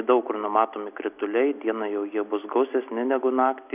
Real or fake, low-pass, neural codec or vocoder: real; 3.6 kHz; none